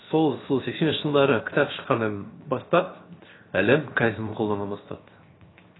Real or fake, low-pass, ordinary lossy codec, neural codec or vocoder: fake; 7.2 kHz; AAC, 16 kbps; codec, 16 kHz, 0.7 kbps, FocalCodec